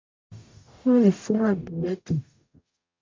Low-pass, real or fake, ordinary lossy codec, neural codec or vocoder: 7.2 kHz; fake; MP3, 48 kbps; codec, 44.1 kHz, 0.9 kbps, DAC